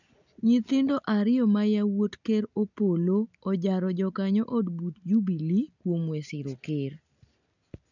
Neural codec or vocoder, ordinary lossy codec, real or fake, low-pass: none; none; real; 7.2 kHz